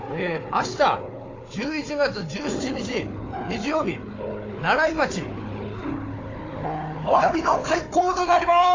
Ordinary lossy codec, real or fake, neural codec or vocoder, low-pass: AAC, 32 kbps; fake; codec, 16 kHz, 4 kbps, FunCodec, trained on Chinese and English, 50 frames a second; 7.2 kHz